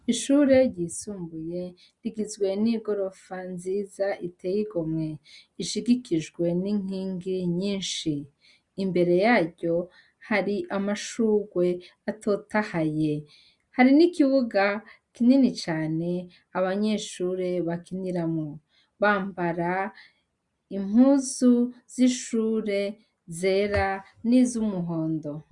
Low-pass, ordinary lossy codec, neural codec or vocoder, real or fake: 10.8 kHz; Opus, 64 kbps; none; real